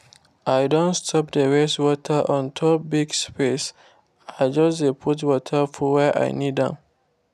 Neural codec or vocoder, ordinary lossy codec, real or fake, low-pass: none; none; real; 14.4 kHz